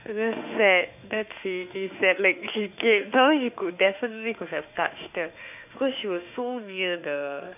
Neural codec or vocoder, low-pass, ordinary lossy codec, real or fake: autoencoder, 48 kHz, 32 numbers a frame, DAC-VAE, trained on Japanese speech; 3.6 kHz; none; fake